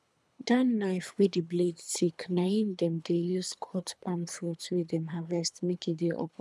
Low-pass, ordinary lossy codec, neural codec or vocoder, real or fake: none; none; codec, 24 kHz, 3 kbps, HILCodec; fake